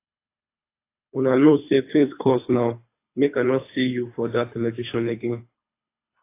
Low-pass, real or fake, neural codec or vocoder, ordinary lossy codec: 3.6 kHz; fake; codec, 24 kHz, 3 kbps, HILCodec; AAC, 24 kbps